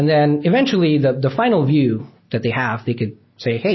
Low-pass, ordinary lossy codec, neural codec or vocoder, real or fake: 7.2 kHz; MP3, 24 kbps; none; real